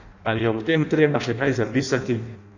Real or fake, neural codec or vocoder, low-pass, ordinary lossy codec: fake; codec, 16 kHz in and 24 kHz out, 0.6 kbps, FireRedTTS-2 codec; 7.2 kHz; none